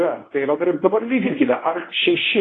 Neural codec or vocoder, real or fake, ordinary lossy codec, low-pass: codec, 24 kHz, 0.9 kbps, WavTokenizer, medium speech release version 1; fake; AAC, 32 kbps; 10.8 kHz